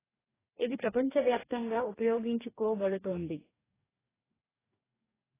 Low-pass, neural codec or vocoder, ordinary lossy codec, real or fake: 3.6 kHz; codec, 44.1 kHz, 2.6 kbps, DAC; AAC, 16 kbps; fake